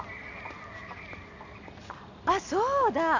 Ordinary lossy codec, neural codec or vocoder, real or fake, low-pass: AAC, 48 kbps; none; real; 7.2 kHz